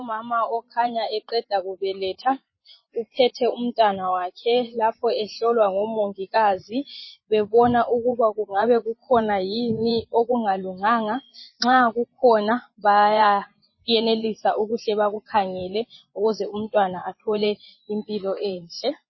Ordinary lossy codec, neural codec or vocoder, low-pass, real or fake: MP3, 24 kbps; vocoder, 24 kHz, 100 mel bands, Vocos; 7.2 kHz; fake